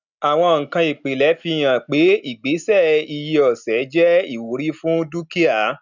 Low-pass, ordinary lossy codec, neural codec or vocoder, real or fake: 7.2 kHz; none; none; real